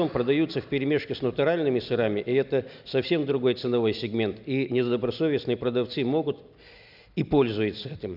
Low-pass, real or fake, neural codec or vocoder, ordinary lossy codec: 5.4 kHz; real; none; none